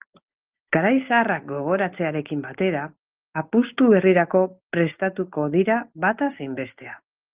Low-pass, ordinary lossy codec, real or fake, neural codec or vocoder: 3.6 kHz; Opus, 32 kbps; real; none